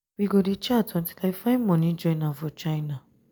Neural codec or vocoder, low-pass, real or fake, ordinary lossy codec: none; none; real; none